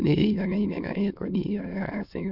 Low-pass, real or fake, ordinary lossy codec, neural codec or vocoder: 5.4 kHz; fake; none; autoencoder, 22.05 kHz, a latent of 192 numbers a frame, VITS, trained on many speakers